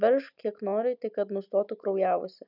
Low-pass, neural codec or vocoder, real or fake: 5.4 kHz; none; real